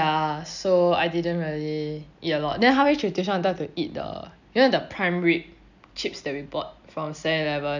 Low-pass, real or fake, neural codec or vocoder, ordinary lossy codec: 7.2 kHz; real; none; none